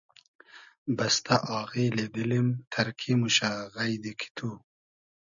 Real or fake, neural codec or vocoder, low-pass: real; none; 7.2 kHz